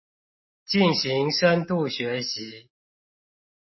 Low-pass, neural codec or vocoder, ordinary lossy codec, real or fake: 7.2 kHz; none; MP3, 24 kbps; real